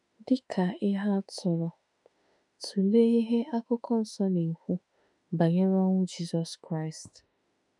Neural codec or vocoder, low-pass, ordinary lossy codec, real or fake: autoencoder, 48 kHz, 32 numbers a frame, DAC-VAE, trained on Japanese speech; 10.8 kHz; none; fake